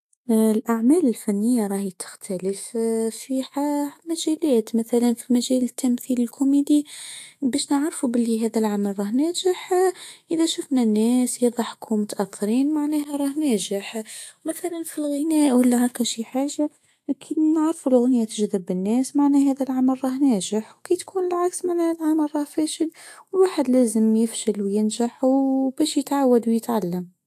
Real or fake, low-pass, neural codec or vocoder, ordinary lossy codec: fake; 14.4 kHz; autoencoder, 48 kHz, 128 numbers a frame, DAC-VAE, trained on Japanese speech; AAC, 64 kbps